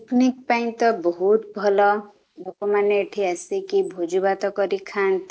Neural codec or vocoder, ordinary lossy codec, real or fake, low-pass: none; none; real; none